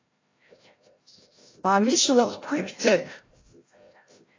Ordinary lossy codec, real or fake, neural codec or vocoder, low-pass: AAC, 32 kbps; fake; codec, 16 kHz, 0.5 kbps, FreqCodec, larger model; 7.2 kHz